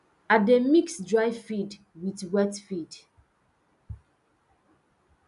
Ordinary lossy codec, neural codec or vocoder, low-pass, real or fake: none; none; 10.8 kHz; real